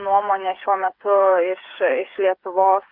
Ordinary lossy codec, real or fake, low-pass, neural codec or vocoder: Opus, 64 kbps; fake; 5.4 kHz; codec, 16 kHz, 8 kbps, FreqCodec, smaller model